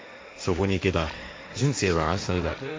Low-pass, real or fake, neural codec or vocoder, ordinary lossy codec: none; fake; codec, 16 kHz, 1.1 kbps, Voila-Tokenizer; none